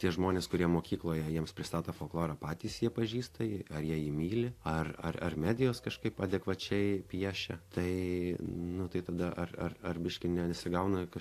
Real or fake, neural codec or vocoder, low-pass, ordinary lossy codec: real; none; 14.4 kHz; AAC, 64 kbps